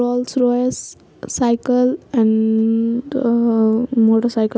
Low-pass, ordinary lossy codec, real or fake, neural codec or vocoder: none; none; real; none